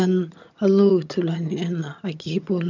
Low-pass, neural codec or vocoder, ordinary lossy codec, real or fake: 7.2 kHz; vocoder, 22.05 kHz, 80 mel bands, HiFi-GAN; none; fake